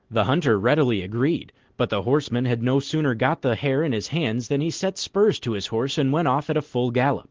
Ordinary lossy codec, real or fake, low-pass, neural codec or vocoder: Opus, 16 kbps; real; 7.2 kHz; none